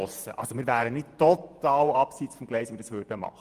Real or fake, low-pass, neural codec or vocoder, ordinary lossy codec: fake; 14.4 kHz; vocoder, 48 kHz, 128 mel bands, Vocos; Opus, 32 kbps